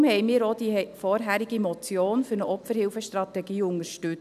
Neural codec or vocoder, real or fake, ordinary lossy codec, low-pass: none; real; none; 14.4 kHz